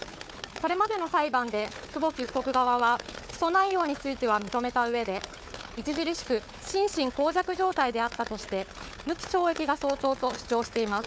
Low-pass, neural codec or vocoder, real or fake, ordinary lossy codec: none; codec, 16 kHz, 4 kbps, FunCodec, trained on Chinese and English, 50 frames a second; fake; none